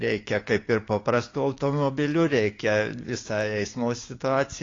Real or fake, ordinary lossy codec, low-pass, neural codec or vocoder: fake; AAC, 32 kbps; 7.2 kHz; codec, 16 kHz, 6 kbps, DAC